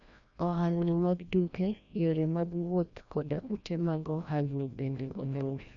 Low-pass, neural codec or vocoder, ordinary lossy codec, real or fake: 7.2 kHz; codec, 16 kHz, 1 kbps, FreqCodec, larger model; none; fake